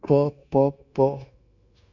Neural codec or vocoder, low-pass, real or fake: codec, 16 kHz, 0.9 kbps, LongCat-Audio-Codec; 7.2 kHz; fake